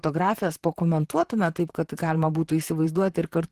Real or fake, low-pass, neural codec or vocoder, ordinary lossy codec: fake; 14.4 kHz; vocoder, 44.1 kHz, 128 mel bands, Pupu-Vocoder; Opus, 16 kbps